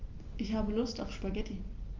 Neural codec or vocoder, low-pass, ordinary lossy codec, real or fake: none; 7.2 kHz; Opus, 32 kbps; real